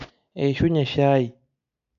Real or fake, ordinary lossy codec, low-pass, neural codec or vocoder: real; none; 7.2 kHz; none